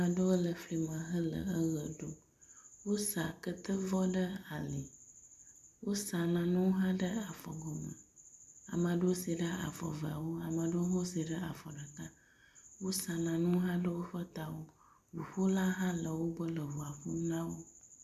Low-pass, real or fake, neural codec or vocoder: 14.4 kHz; real; none